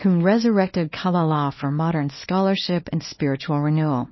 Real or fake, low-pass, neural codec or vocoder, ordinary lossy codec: fake; 7.2 kHz; codec, 24 kHz, 0.9 kbps, WavTokenizer, small release; MP3, 24 kbps